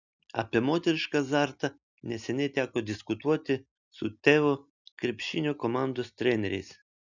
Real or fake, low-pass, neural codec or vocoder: real; 7.2 kHz; none